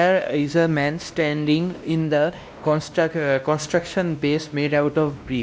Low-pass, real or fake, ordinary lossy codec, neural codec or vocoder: none; fake; none; codec, 16 kHz, 1 kbps, X-Codec, WavLM features, trained on Multilingual LibriSpeech